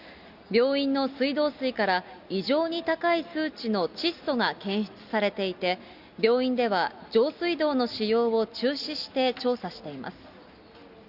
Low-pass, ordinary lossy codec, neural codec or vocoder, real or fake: 5.4 kHz; Opus, 64 kbps; none; real